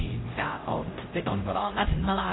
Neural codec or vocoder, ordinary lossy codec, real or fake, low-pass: codec, 16 kHz, 0.5 kbps, X-Codec, HuBERT features, trained on LibriSpeech; AAC, 16 kbps; fake; 7.2 kHz